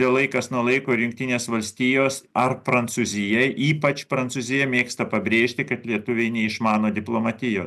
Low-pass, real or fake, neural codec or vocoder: 14.4 kHz; real; none